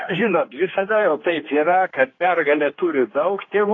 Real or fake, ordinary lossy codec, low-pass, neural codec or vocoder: fake; AAC, 32 kbps; 7.2 kHz; codec, 16 kHz, 2 kbps, X-Codec, HuBERT features, trained on general audio